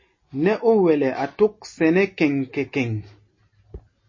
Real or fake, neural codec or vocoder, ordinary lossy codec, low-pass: real; none; MP3, 32 kbps; 7.2 kHz